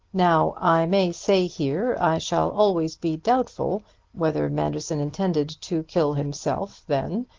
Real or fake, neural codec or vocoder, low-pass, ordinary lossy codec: real; none; 7.2 kHz; Opus, 16 kbps